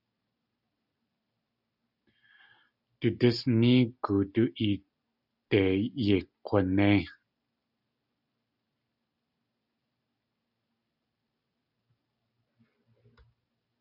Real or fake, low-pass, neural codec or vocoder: real; 5.4 kHz; none